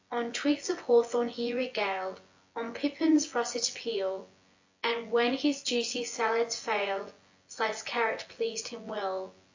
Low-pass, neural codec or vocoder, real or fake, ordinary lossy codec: 7.2 kHz; vocoder, 24 kHz, 100 mel bands, Vocos; fake; AAC, 48 kbps